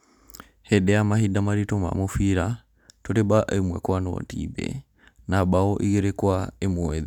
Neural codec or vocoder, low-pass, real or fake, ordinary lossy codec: none; 19.8 kHz; real; none